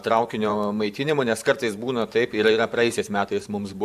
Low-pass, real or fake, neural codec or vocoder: 14.4 kHz; fake; vocoder, 44.1 kHz, 128 mel bands every 512 samples, BigVGAN v2